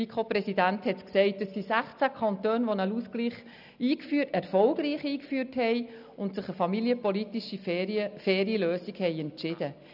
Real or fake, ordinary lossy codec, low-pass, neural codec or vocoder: real; none; 5.4 kHz; none